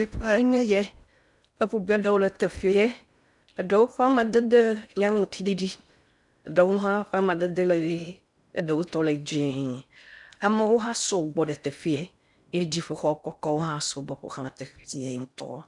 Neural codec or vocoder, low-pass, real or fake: codec, 16 kHz in and 24 kHz out, 0.6 kbps, FocalCodec, streaming, 4096 codes; 10.8 kHz; fake